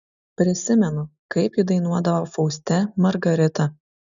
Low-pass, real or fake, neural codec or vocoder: 7.2 kHz; real; none